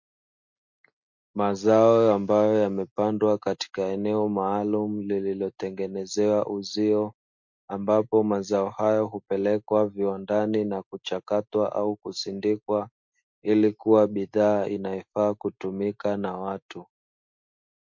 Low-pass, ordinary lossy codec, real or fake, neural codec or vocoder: 7.2 kHz; MP3, 48 kbps; real; none